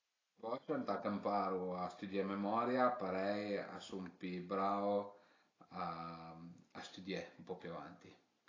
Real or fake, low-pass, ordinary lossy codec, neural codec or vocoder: real; 7.2 kHz; AAC, 32 kbps; none